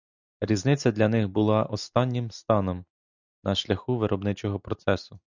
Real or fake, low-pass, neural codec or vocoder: real; 7.2 kHz; none